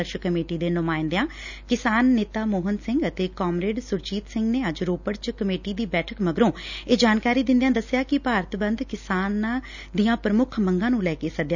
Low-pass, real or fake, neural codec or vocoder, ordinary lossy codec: 7.2 kHz; real; none; none